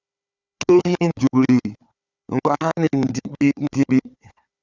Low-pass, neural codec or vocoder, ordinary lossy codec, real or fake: 7.2 kHz; codec, 16 kHz, 4 kbps, FunCodec, trained on Chinese and English, 50 frames a second; Opus, 64 kbps; fake